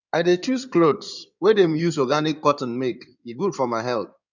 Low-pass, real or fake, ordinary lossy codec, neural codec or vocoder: 7.2 kHz; fake; none; codec, 16 kHz in and 24 kHz out, 2.2 kbps, FireRedTTS-2 codec